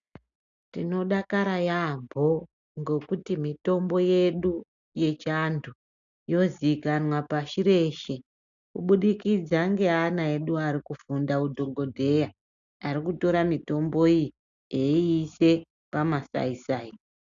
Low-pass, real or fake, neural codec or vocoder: 7.2 kHz; real; none